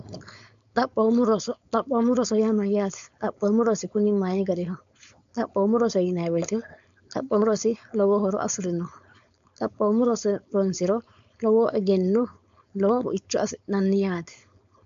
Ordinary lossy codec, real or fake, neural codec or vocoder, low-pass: MP3, 64 kbps; fake; codec, 16 kHz, 4.8 kbps, FACodec; 7.2 kHz